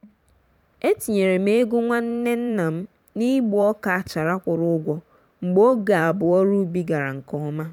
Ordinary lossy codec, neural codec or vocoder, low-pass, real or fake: none; vocoder, 44.1 kHz, 128 mel bands every 512 samples, BigVGAN v2; 19.8 kHz; fake